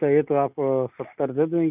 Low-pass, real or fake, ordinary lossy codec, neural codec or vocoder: 3.6 kHz; real; none; none